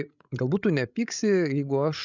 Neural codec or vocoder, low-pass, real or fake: codec, 16 kHz, 16 kbps, FreqCodec, larger model; 7.2 kHz; fake